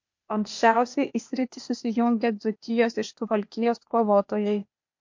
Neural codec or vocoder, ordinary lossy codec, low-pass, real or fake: codec, 16 kHz, 0.8 kbps, ZipCodec; MP3, 48 kbps; 7.2 kHz; fake